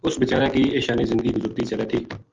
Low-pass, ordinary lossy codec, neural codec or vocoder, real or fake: 7.2 kHz; Opus, 32 kbps; none; real